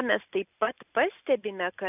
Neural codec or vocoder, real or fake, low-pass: none; real; 3.6 kHz